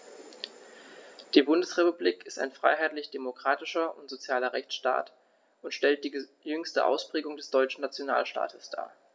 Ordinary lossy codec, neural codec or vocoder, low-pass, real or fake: none; none; none; real